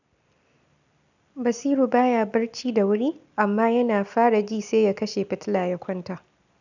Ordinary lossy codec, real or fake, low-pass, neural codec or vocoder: none; real; 7.2 kHz; none